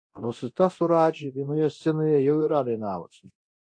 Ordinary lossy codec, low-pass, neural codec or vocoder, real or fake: AAC, 48 kbps; 9.9 kHz; codec, 24 kHz, 0.9 kbps, DualCodec; fake